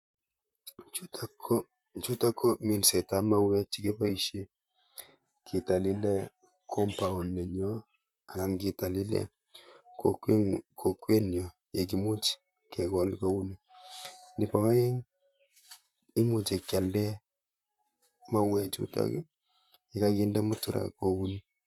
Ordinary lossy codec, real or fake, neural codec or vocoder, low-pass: none; fake; vocoder, 44.1 kHz, 128 mel bands, Pupu-Vocoder; 19.8 kHz